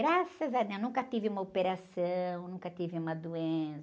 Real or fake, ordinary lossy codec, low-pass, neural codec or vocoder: real; none; none; none